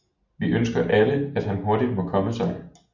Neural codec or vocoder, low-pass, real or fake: none; 7.2 kHz; real